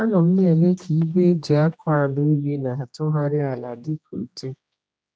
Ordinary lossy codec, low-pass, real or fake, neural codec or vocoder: none; none; fake; codec, 16 kHz, 1 kbps, X-Codec, HuBERT features, trained on general audio